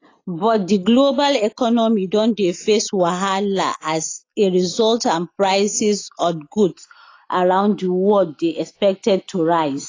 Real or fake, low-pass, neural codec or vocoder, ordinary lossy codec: real; 7.2 kHz; none; AAC, 32 kbps